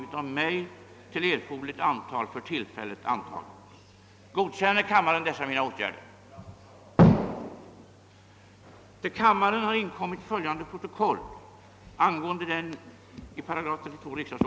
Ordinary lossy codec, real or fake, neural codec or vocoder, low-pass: none; real; none; none